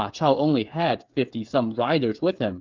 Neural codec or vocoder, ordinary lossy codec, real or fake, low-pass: codec, 16 kHz, 8 kbps, FreqCodec, smaller model; Opus, 24 kbps; fake; 7.2 kHz